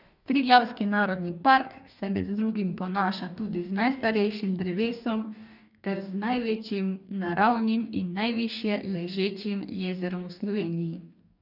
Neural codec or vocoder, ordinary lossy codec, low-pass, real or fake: codec, 44.1 kHz, 2.6 kbps, DAC; none; 5.4 kHz; fake